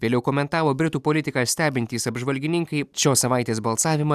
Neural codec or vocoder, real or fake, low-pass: none; real; 14.4 kHz